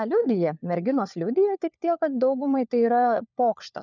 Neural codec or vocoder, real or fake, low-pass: codec, 16 kHz, 4 kbps, FunCodec, trained on LibriTTS, 50 frames a second; fake; 7.2 kHz